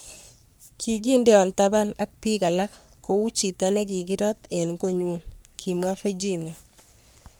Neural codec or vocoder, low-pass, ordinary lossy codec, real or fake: codec, 44.1 kHz, 3.4 kbps, Pupu-Codec; none; none; fake